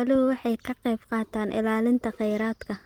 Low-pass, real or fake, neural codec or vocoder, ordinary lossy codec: 19.8 kHz; real; none; Opus, 24 kbps